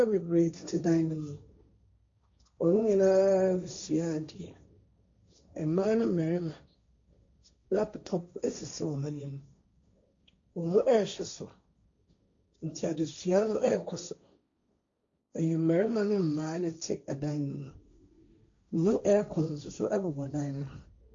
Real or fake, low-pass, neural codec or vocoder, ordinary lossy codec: fake; 7.2 kHz; codec, 16 kHz, 1.1 kbps, Voila-Tokenizer; MP3, 48 kbps